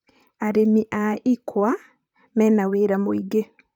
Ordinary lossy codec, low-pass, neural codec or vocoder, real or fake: none; 19.8 kHz; vocoder, 44.1 kHz, 128 mel bands, Pupu-Vocoder; fake